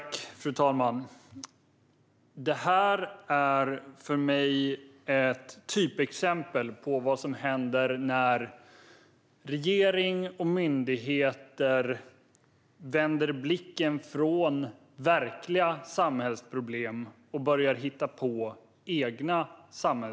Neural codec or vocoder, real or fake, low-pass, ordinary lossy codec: none; real; none; none